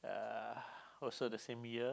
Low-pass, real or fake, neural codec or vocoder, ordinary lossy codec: none; real; none; none